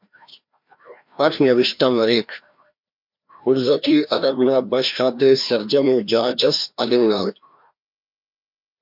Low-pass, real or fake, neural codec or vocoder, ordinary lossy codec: 5.4 kHz; fake; codec, 16 kHz, 1 kbps, FreqCodec, larger model; MP3, 48 kbps